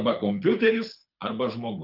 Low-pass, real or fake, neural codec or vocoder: 5.4 kHz; fake; codec, 24 kHz, 6 kbps, HILCodec